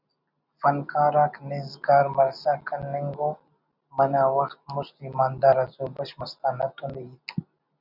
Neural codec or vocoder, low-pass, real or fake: none; 5.4 kHz; real